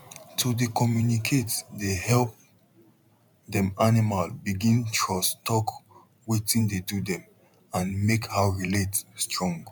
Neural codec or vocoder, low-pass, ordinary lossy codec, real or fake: vocoder, 44.1 kHz, 128 mel bands every 512 samples, BigVGAN v2; 19.8 kHz; none; fake